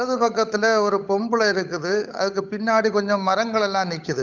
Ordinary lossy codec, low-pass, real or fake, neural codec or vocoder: none; 7.2 kHz; fake; codec, 16 kHz, 8 kbps, FunCodec, trained on Chinese and English, 25 frames a second